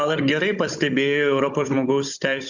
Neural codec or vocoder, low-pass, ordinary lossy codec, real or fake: codec, 16 kHz, 16 kbps, FunCodec, trained on Chinese and English, 50 frames a second; 7.2 kHz; Opus, 64 kbps; fake